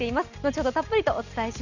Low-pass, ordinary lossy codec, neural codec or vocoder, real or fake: 7.2 kHz; none; none; real